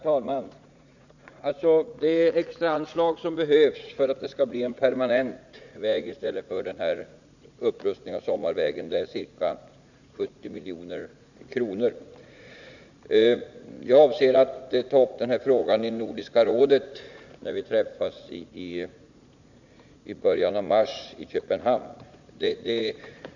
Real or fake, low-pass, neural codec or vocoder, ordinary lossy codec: fake; 7.2 kHz; vocoder, 44.1 kHz, 80 mel bands, Vocos; none